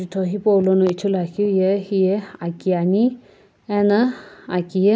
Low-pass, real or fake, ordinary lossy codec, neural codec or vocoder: none; real; none; none